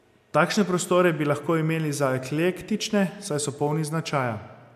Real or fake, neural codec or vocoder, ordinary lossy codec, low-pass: real; none; MP3, 96 kbps; 14.4 kHz